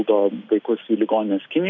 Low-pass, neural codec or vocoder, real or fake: 7.2 kHz; none; real